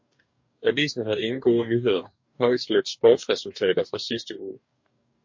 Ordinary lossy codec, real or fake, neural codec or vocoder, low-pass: MP3, 48 kbps; fake; codec, 44.1 kHz, 2.6 kbps, DAC; 7.2 kHz